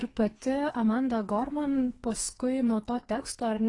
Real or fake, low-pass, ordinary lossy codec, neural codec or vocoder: fake; 10.8 kHz; AAC, 32 kbps; codec, 44.1 kHz, 2.6 kbps, SNAC